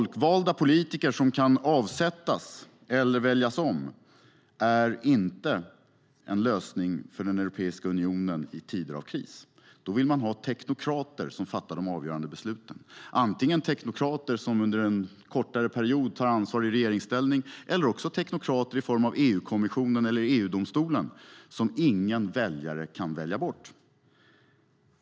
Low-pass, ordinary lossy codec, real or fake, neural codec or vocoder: none; none; real; none